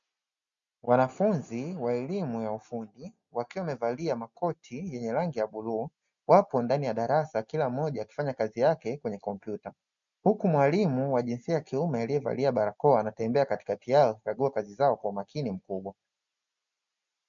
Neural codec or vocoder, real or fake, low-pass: none; real; 7.2 kHz